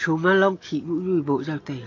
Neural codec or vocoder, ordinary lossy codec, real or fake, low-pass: none; AAC, 32 kbps; real; 7.2 kHz